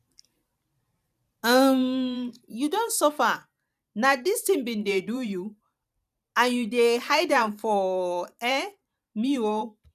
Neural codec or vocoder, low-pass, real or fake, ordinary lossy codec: vocoder, 44.1 kHz, 128 mel bands every 512 samples, BigVGAN v2; 14.4 kHz; fake; none